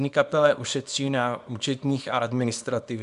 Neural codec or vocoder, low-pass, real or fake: codec, 24 kHz, 0.9 kbps, WavTokenizer, small release; 10.8 kHz; fake